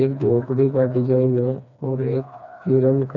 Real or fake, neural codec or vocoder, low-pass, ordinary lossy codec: fake; codec, 16 kHz, 2 kbps, FreqCodec, smaller model; 7.2 kHz; none